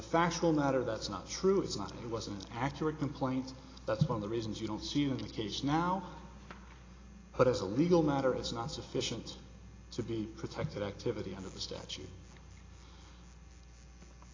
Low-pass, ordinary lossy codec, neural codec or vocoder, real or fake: 7.2 kHz; AAC, 32 kbps; none; real